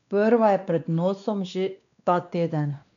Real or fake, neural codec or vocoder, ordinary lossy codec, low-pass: fake; codec, 16 kHz, 2 kbps, X-Codec, WavLM features, trained on Multilingual LibriSpeech; none; 7.2 kHz